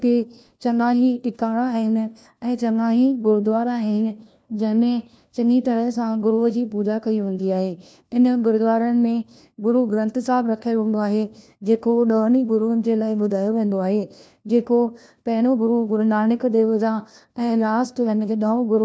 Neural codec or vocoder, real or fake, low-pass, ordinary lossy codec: codec, 16 kHz, 1 kbps, FunCodec, trained on LibriTTS, 50 frames a second; fake; none; none